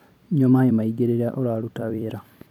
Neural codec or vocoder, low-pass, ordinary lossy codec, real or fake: vocoder, 44.1 kHz, 128 mel bands every 256 samples, BigVGAN v2; 19.8 kHz; none; fake